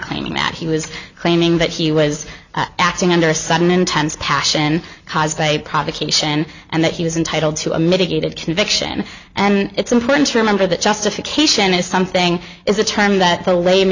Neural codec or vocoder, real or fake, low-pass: none; real; 7.2 kHz